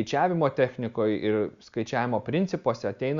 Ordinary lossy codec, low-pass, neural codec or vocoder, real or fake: Opus, 64 kbps; 7.2 kHz; none; real